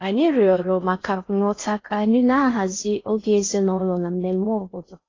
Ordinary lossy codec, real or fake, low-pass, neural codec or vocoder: AAC, 32 kbps; fake; 7.2 kHz; codec, 16 kHz in and 24 kHz out, 0.6 kbps, FocalCodec, streaming, 4096 codes